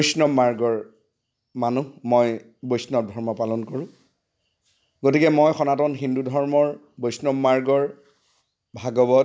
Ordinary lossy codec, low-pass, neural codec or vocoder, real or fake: none; none; none; real